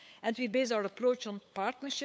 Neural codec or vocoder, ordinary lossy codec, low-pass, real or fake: codec, 16 kHz, 8 kbps, FunCodec, trained on LibriTTS, 25 frames a second; none; none; fake